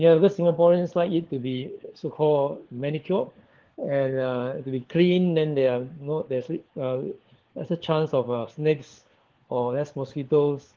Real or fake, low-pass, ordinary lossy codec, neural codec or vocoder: fake; 7.2 kHz; Opus, 16 kbps; codec, 16 kHz, 4 kbps, FunCodec, trained on LibriTTS, 50 frames a second